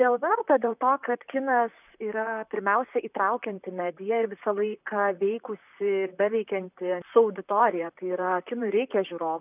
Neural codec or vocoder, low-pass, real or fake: vocoder, 44.1 kHz, 128 mel bands, Pupu-Vocoder; 3.6 kHz; fake